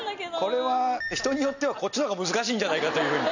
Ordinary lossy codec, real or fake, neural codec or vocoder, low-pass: none; real; none; 7.2 kHz